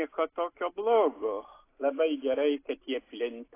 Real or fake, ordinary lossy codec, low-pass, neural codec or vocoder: real; AAC, 24 kbps; 3.6 kHz; none